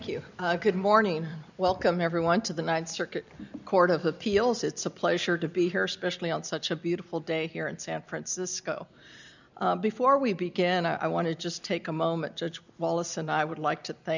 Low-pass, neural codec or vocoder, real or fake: 7.2 kHz; none; real